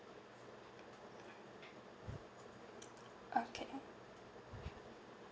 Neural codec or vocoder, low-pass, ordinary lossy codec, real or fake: none; none; none; real